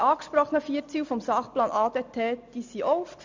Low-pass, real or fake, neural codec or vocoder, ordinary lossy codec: 7.2 kHz; real; none; MP3, 64 kbps